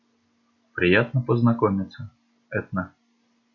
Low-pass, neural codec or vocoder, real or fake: 7.2 kHz; none; real